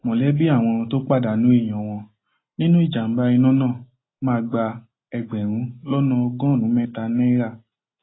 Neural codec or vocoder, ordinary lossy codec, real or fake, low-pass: none; AAC, 16 kbps; real; 7.2 kHz